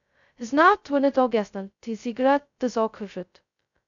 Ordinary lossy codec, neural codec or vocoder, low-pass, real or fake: MP3, 96 kbps; codec, 16 kHz, 0.2 kbps, FocalCodec; 7.2 kHz; fake